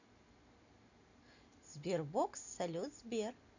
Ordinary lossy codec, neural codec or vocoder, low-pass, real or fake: none; none; 7.2 kHz; real